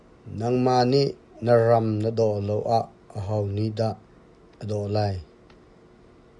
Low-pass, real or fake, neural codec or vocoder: 10.8 kHz; real; none